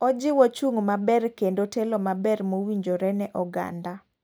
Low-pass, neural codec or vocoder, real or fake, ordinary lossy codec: none; none; real; none